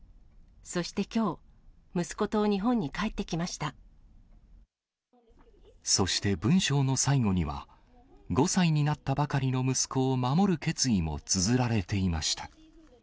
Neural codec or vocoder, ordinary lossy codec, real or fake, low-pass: none; none; real; none